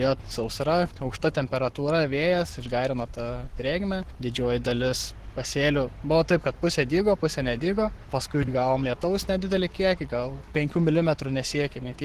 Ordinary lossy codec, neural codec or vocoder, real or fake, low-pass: Opus, 16 kbps; codec, 44.1 kHz, 7.8 kbps, Pupu-Codec; fake; 14.4 kHz